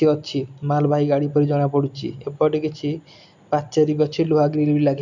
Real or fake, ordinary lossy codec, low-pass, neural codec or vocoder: real; none; 7.2 kHz; none